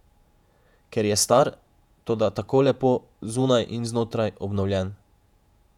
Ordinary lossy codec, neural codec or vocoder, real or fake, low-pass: none; vocoder, 48 kHz, 128 mel bands, Vocos; fake; 19.8 kHz